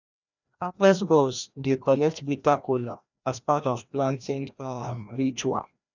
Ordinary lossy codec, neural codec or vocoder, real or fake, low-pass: none; codec, 16 kHz, 1 kbps, FreqCodec, larger model; fake; 7.2 kHz